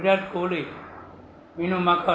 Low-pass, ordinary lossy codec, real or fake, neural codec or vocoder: none; none; real; none